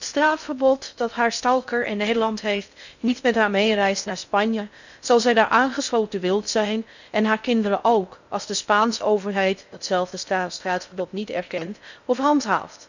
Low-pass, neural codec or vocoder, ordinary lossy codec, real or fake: 7.2 kHz; codec, 16 kHz in and 24 kHz out, 0.6 kbps, FocalCodec, streaming, 2048 codes; none; fake